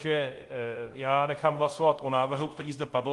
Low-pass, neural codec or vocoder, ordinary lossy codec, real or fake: 10.8 kHz; codec, 24 kHz, 0.5 kbps, DualCodec; Opus, 24 kbps; fake